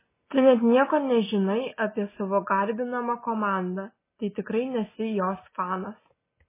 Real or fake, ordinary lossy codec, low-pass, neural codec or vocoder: real; MP3, 16 kbps; 3.6 kHz; none